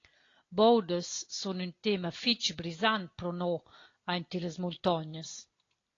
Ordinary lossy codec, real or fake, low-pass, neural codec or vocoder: AAC, 32 kbps; real; 7.2 kHz; none